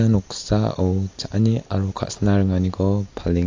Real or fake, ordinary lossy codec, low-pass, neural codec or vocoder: real; AAC, 48 kbps; 7.2 kHz; none